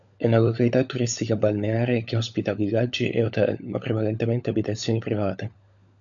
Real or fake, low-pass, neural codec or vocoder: fake; 7.2 kHz; codec, 16 kHz, 16 kbps, FunCodec, trained on LibriTTS, 50 frames a second